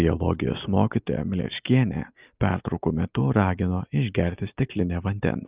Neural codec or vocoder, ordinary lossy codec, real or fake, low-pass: none; Opus, 24 kbps; real; 3.6 kHz